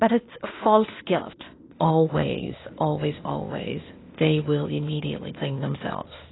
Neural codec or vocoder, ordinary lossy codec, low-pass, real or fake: codec, 16 kHz, 0.8 kbps, ZipCodec; AAC, 16 kbps; 7.2 kHz; fake